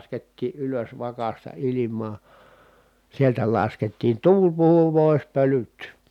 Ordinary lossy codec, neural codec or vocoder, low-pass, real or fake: none; none; 19.8 kHz; real